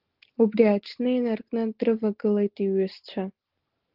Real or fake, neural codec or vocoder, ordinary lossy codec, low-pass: real; none; Opus, 16 kbps; 5.4 kHz